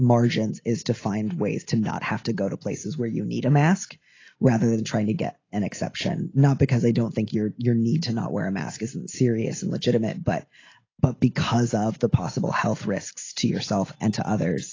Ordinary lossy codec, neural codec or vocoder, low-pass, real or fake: AAC, 32 kbps; none; 7.2 kHz; real